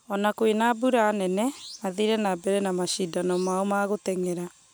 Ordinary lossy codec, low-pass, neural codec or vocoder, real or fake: none; none; none; real